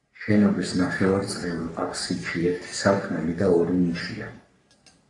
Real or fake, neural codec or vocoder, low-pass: fake; codec, 44.1 kHz, 3.4 kbps, Pupu-Codec; 10.8 kHz